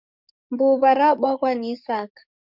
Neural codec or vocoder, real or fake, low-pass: codec, 44.1 kHz, 7.8 kbps, DAC; fake; 5.4 kHz